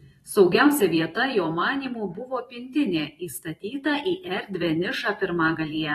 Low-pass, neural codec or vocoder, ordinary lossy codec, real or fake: 19.8 kHz; vocoder, 44.1 kHz, 128 mel bands every 512 samples, BigVGAN v2; AAC, 32 kbps; fake